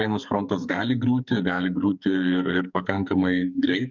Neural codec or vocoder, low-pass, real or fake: codec, 44.1 kHz, 2.6 kbps, SNAC; 7.2 kHz; fake